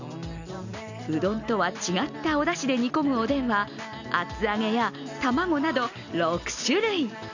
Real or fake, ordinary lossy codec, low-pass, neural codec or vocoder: real; none; 7.2 kHz; none